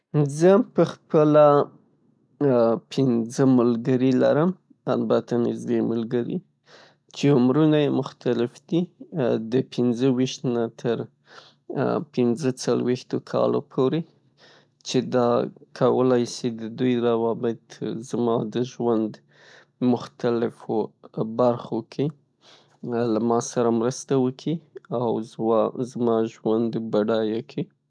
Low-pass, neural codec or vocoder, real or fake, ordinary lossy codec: none; none; real; none